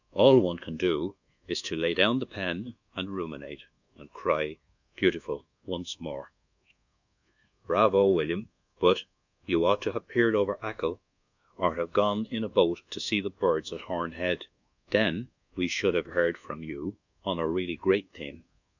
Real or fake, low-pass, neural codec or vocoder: fake; 7.2 kHz; codec, 24 kHz, 1.2 kbps, DualCodec